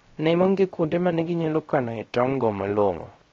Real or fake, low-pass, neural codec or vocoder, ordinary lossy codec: fake; 7.2 kHz; codec, 16 kHz, 0.3 kbps, FocalCodec; AAC, 32 kbps